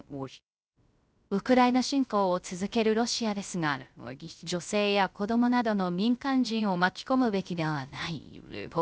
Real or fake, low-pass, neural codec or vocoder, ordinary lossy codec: fake; none; codec, 16 kHz, 0.3 kbps, FocalCodec; none